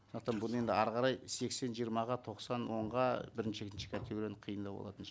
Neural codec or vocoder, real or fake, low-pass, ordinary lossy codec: none; real; none; none